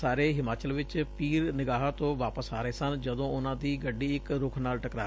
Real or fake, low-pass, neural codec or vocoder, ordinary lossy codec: real; none; none; none